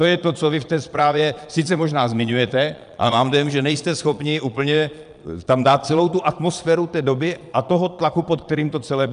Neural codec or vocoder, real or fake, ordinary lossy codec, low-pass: vocoder, 22.05 kHz, 80 mel bands, Vocos; fake; MP3, 96 kbps; 9.9 kHz